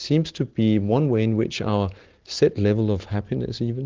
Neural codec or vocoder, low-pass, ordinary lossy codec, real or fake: none; 7.2 kHz; Opus, 16 kbps; real